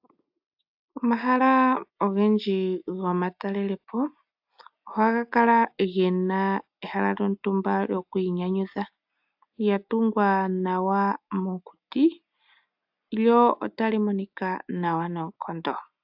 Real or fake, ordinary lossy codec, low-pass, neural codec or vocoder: real; AAC, 48 kbps; 5.4 kHz; none